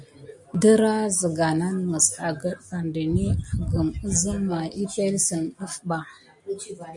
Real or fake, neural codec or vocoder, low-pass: real; none; 10.8 kHz